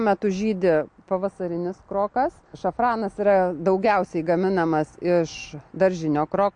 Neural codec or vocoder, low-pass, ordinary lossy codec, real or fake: none; 9.9 kHz; MP3, 48 kbps; real